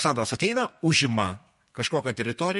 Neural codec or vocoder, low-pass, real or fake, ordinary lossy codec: codec, 32 kHz, 1.9 kbps, SNAC; 14.4 kHz; fake; MP3, 48 kbps